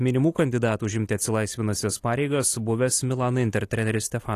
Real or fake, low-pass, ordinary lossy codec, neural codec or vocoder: real; 14.4 kHz; AAC, 48 kbps; none